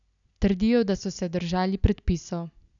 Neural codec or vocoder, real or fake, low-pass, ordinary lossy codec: none; real; 7.2 kHz; none